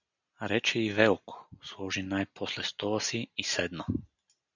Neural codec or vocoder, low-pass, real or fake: none; 7.2 kHz; real